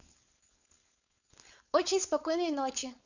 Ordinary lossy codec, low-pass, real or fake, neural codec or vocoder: none; 7.2 kHz; fake; codec, 16 kHz, 4.8 kbps, FACodec